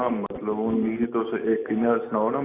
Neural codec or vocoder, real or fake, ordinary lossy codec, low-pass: none; real; AAC, 24 kbps; 3.6 kHz